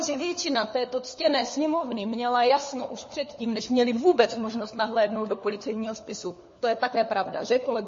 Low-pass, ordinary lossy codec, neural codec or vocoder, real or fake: 7.2 kHz; MP3, 32 kbps; codec, 16 kHz, 4 kbps, FunCodec, trained on LibriTTS, 50 frames a second; fake